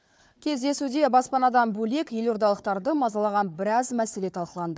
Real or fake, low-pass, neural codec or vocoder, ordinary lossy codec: fake; none; codec, 16 kHz, 4 kbps, FunCodec, trained on Chinese and English, 50 frames a second; none